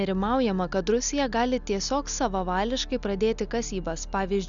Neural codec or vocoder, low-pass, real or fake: none; 7.2 kHz; real